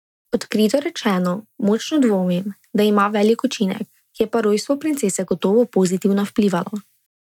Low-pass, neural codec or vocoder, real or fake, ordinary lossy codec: 19.8 kHz; none; real; none